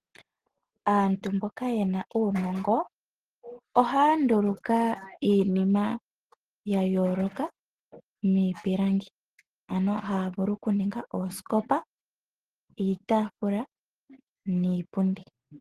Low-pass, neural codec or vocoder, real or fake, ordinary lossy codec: 14.4 kHz; none; real; Opus, 16 kbps